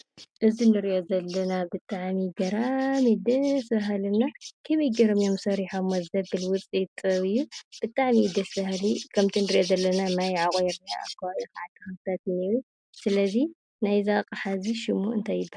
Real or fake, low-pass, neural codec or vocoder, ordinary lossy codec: real; 9.9 kHz; none; MP3, 96 kbps